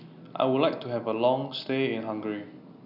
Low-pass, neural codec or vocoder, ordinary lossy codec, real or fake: 5.4 kHz; none; none; real